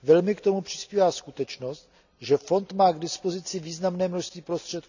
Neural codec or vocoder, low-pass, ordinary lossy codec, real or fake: none; 7.2 kHz; none; real